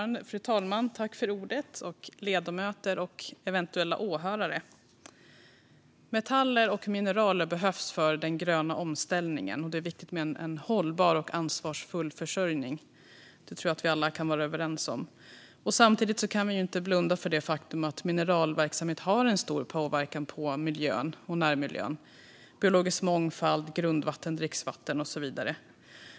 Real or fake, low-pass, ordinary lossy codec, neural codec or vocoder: real; none; none; none